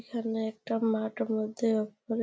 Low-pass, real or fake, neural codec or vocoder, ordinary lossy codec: none; real; none; none